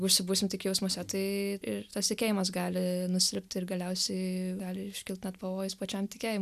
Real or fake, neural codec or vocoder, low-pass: real; none; 14.4 kHz